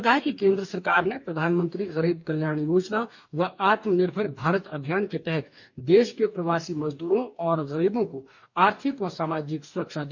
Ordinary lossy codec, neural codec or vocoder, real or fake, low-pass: none; codec, 44.1 kHz, 2.6 kbps, DAC; fake; 7.2 kHz